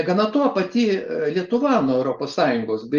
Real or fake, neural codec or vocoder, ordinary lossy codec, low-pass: real; none; Opus, 32 kbps; 7.2 kHz